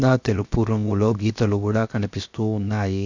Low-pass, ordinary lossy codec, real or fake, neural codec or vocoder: 7.2 kHz; none; fake; codec, 16 kHz, 0.7 kbps, FocalCodec